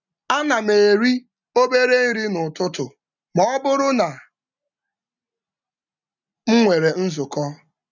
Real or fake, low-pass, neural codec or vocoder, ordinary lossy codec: real; 7.2 kHz; none; none